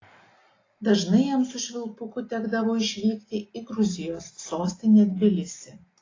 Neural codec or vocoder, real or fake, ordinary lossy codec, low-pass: none; real; AAC, 32 kbps; 7.2 kHz